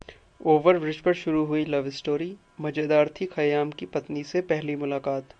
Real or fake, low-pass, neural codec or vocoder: real; 9.9 kHz; none